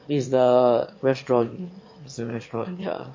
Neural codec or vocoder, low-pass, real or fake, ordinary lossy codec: autoencoder, 22.05 kHz, a latent of 192 numbers a frame, VITS, trained on one speaker; 7.2 kHz; fake; MP3, 32 kbps